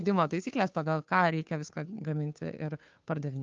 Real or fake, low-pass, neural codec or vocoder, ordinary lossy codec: fake; 7.2 kHz; codec, 16 kHz, 2 kbps, FunCodec, trained on Chinese and English, 25 frames a second; Opus, 24 kbps